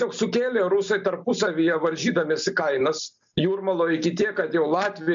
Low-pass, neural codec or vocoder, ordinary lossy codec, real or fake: 7.2 kHz; none; MP3, 48 kbps; real